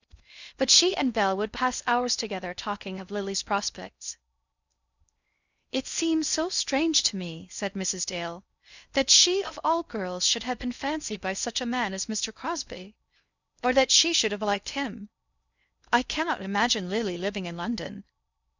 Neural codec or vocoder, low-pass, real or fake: codec, 16 kHz in and 24 kHz out, 0.6 kbps, FocalCodec, streaming, 2048 codes; 7.2 kHz; fake